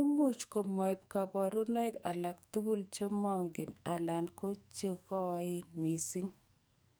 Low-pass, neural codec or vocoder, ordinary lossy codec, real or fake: none; codec, 44.1 kHz, 2.6 kbps, SNAC; none; fake